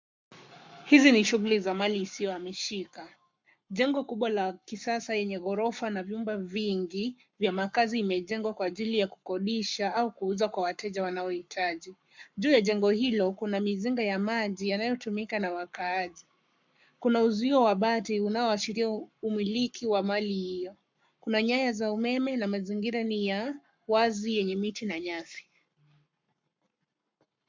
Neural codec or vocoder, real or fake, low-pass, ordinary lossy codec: codec, 44.1 kHz, 7.8 kbps, Pupu-Codec; fake; 7.2 kHz; MP3, 64 kbps